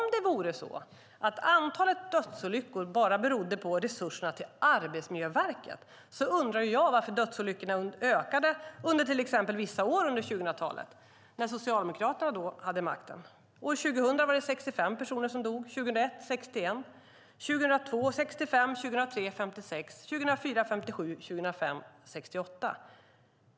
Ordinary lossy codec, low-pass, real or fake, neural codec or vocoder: none; none; real; none